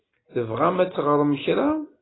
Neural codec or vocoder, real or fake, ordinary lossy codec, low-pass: none; real; AAC, 16 kbps; 7.2 kHz